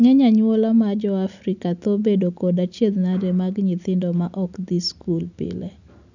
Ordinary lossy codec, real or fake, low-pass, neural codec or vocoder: none; real; 7.2 kHz; none